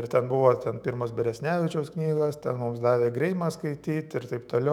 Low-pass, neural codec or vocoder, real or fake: 19.8 kHz; autoencoder, 48 kHz, 128 numbers a frame, DAC-VAE, trained on Japanese speech; fake